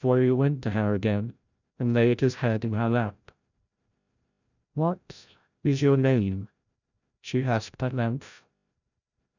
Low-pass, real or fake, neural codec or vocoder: 7.2 kHz; fake; codec, 16 kHz, 0.5 kbps, FreqCodec, larger model